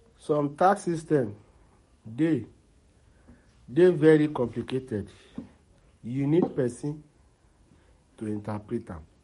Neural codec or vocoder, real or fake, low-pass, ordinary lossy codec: codec, 44.1 kHz, 7.8 kbps, DAC; fake; 19.8 kHz; MP3, 48 kbps